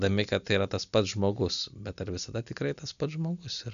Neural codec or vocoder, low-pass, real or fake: none; 7.2 kHz; real